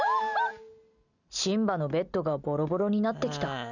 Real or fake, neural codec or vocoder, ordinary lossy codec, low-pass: real; none; none; 7.2 kHz